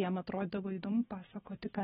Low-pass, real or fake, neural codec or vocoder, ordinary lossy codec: 19.8 kHz; real; none; AAC, 16 kbps